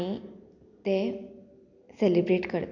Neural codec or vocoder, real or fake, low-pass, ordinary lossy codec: none; real; 7.2 kHz; none